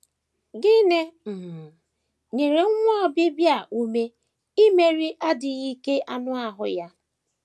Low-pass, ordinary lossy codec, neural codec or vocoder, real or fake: none; none; none; real